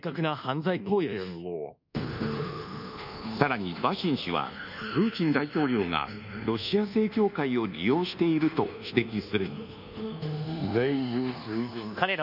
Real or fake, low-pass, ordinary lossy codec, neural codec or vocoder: fake; 5.4 kHz; none; codec, 24 kHz, 1.2 kbps, DualCodec